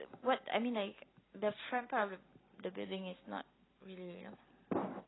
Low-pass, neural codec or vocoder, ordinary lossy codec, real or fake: 7.2 kHz; none; AAC, 16 kbps; real